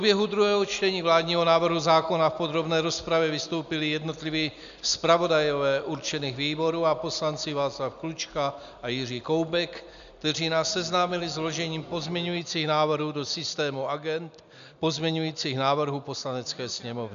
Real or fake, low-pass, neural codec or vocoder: real; 7.2 kHz; none